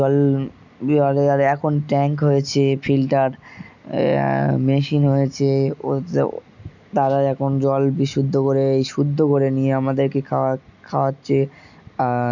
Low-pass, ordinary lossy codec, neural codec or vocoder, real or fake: 7.2 kHz; none; none; real